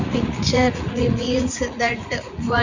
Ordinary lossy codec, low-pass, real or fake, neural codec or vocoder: none; 7.2 kHz; fake; vocoder, 44.1 kHz, 80 mel bands, Vocos